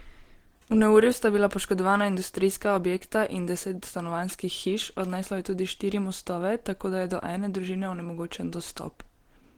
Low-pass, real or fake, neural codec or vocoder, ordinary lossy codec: 19.8 kHz; real; none; Opus, 16 kbps